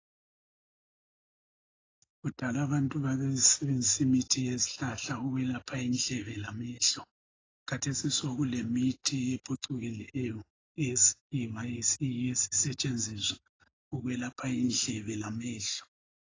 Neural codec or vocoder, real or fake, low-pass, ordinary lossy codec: none; real; 7.2 kHz; AAC, 32 kbps